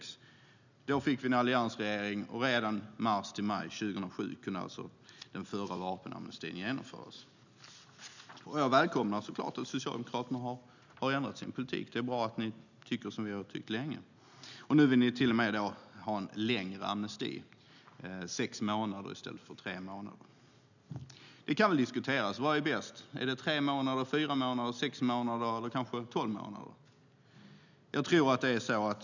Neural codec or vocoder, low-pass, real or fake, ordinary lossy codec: none; 7.2 kHz; real; none